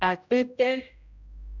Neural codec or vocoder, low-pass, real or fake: codec, 16 kHz, 0.5 kbps, X-Codec, HuBERT features, trained on general audio; 7.2 kHz; fake